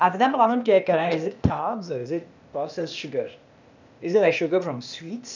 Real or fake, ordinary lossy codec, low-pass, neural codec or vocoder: fake; none; 7.2 kHz; codec, 16 kHz, 0.8 kbps, ZipCodec